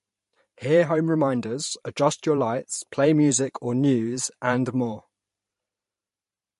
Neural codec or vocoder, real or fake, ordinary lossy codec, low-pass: vocoder, 44.1 kHz, 128 mel bands every 512 samples, BigVGAN v2; fake; MP3, 48 kbps; 14.4 kHz